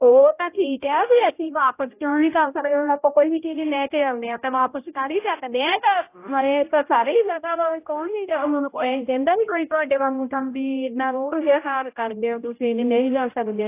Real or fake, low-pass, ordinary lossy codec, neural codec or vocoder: fake; 3.6 kHz; AAC, 24 kbps; codec, 16 kHz, 0.5 kbps, X-Codec, HuBERT features, trained on general audio